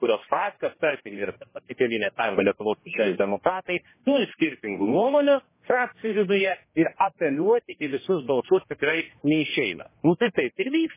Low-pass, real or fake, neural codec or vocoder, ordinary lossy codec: 3.6 kHz; fake; codec, 16 kHz, 1 kbps, X-Codec, HuBERT features, trained on general audio; MP3, 16 kbps